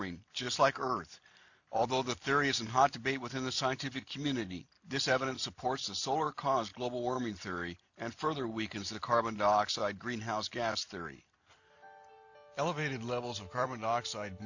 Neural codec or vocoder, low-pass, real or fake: none; 7.2 kHz; real